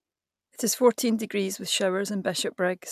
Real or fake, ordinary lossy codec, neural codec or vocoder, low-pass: real; AAC, 96 kbps; none; 14.4 kHz